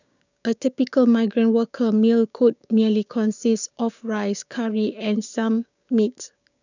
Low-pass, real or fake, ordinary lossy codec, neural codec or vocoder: 7.2 kHz; fake; none; codec, 16 kHz, 6 kbps, DAC